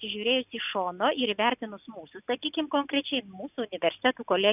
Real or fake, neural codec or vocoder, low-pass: real; none; 3.6 kHz